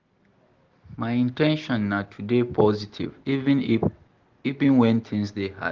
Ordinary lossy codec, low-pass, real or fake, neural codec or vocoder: Opus, 16 kbps; 7.2 kHz; real; none